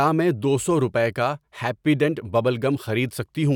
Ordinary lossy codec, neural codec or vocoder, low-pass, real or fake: none; none; 19.8 kHz; real